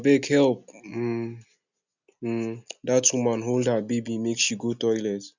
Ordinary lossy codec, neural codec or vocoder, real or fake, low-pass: none; none; real; 7.2 kHz